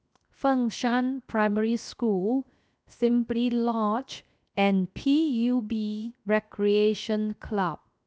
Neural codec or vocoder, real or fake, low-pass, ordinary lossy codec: codec, 16 kHz, 0.7 kbps, FocalCodec; fake; none; none